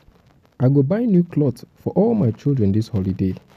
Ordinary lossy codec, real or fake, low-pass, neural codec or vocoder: none; real; 14.4 kHz; none